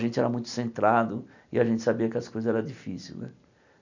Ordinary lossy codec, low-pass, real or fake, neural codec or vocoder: none; 7.2 kHz; real; none